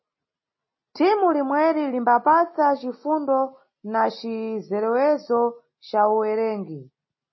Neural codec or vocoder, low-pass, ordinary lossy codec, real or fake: none; 7.2 kHz; MP3, 24 kbps; real